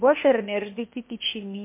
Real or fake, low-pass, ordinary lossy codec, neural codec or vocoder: fake; 3.6 kHz; MP3, 24 kbps; codec, 16 kHz, 0.8 kbps, ZipCodec